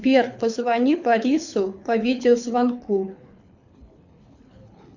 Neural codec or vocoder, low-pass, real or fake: codec, 24 kHz, 6 kbps, HILCodec; 7.2 kHz; fake